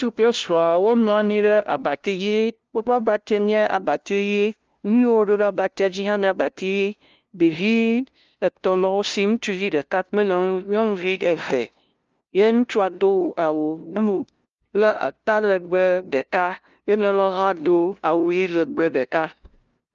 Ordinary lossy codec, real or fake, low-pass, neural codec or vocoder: Opus, 24 kbps; fake; 7.2 kHz; codec, 16 kHz, 0.5 kbps, FunCodec, trained on LibriTTS, 25 frames a second